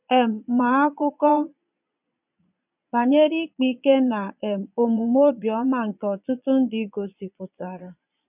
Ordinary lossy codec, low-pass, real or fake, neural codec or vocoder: none; 3.6 kHz; fake; vocoder, 22.05 kHz, 80 mel bands, Vocos